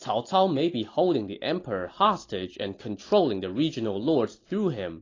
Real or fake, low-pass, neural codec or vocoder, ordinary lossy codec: real; 7.2 kHz; none; AAC, 32 kbps